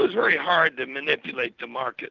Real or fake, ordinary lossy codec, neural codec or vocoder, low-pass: real; Opus, 24 kbps; none; 7.2 kHz